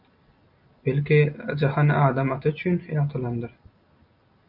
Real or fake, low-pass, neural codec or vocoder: real; 5.4 kHz; none